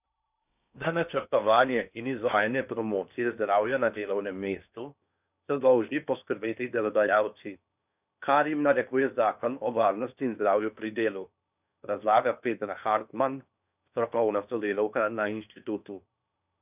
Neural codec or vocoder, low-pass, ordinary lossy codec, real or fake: codec, 16 kHz in and 24 kHz out, 0.8 kbps, FocalCodec, streaming, 65536 codes; 3.6 kHz; none; fake